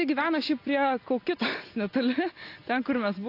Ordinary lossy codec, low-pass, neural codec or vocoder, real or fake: AAC, 32 kbps; 5.4 kHz; none; real